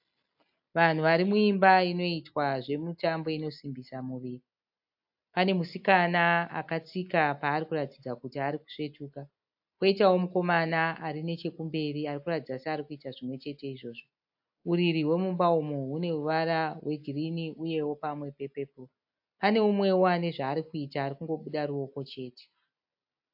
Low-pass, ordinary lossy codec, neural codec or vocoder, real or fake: 5.4 kHz; AAC, 48 kbps; none; real